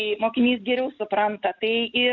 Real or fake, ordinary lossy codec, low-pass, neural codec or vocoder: real; MP3, 64 kbps; 7.2 kHz; none